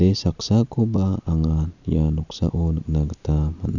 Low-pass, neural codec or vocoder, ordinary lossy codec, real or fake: 7.2 kHz; none; none; real